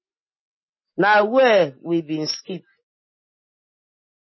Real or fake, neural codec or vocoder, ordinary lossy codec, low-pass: real; none; MP3, 24 kbps; 7.2 kHz